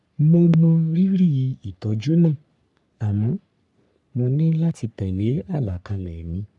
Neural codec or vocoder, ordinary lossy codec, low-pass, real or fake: codec, 44.1 kHz, 3.4 kbps, Pupu-Codec; none; 10.8 kHz; fake